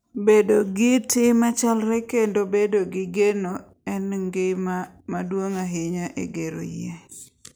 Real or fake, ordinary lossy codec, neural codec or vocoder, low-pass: real; none; none; none